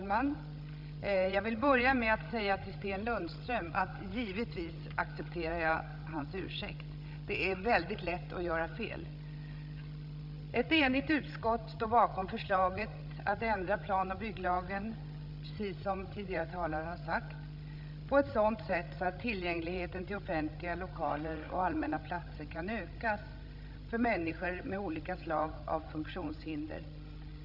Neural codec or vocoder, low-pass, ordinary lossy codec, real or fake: codec, 16 kHz, 16 kbps, FreqCodec, larger model; 5.4 kHz; none; fake